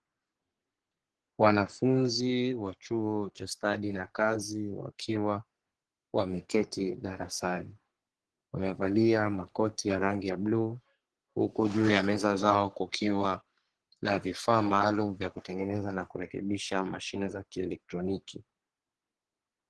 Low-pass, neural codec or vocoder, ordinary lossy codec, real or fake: 10.8 kHz; codec, 44.1 kHz, 3.4 kbps, Pupu-Codec; Opus, 16 kbps; fake